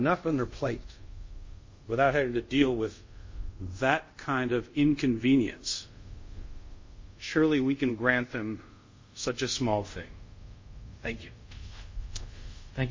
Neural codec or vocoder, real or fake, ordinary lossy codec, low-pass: codec, 24 kHz, 0.5 kbps, DualCodec; fake; MP3, 32 kbps; 7.2 kHz